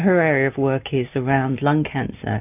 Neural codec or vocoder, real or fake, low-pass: none; real; 3.6 kHz